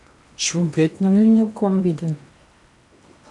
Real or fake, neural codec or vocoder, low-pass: fake; codec, 16 kHz in and 24 kHz out, 0.8 kbps, FocalCodec, streaming, 65536 codes; 10.8 kHz